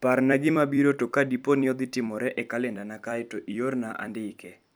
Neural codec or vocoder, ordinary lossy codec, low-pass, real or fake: vocoder, 44.1 kHz, 128 mel bands every 256 samples, BigVGAN v2; none; none; fake